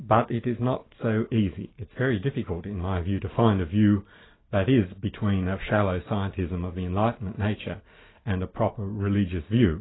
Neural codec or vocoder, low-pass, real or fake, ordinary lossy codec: none; 7.2 kHz; real; AAC, 16 kbps